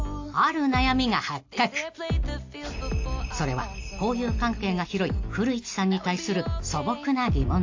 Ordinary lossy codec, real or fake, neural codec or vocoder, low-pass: AAC, 48 kbps; real; none; 7.2 kHz